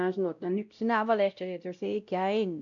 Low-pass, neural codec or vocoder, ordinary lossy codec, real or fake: 7.2 kHz; codec, 16 kHz, 0.5 kbps, X-Codec, WavLM features, trained on Multilingual LibriSpeech; none; fake